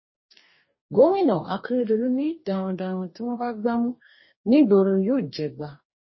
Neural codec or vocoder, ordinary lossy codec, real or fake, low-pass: codec, 44.1 kHz, 2.6 kbps, DAC; MP3, 24 kbps; fake; 7.2 kHz